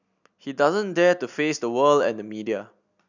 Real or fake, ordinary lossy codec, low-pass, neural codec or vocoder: real; none; 7.2 kHz; none